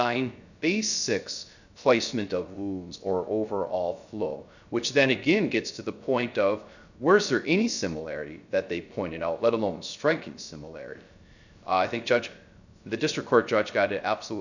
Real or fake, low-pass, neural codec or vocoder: fake; 7.2 kHz; codec, 16 kHz, 0.3 kbps, FocalCodec